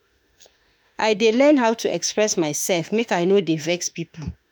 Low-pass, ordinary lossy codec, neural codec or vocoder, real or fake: none; none; autoencoder, 48 kHz, 32 numbers a frame, DAC-VAE, trained on Japanese speech; fake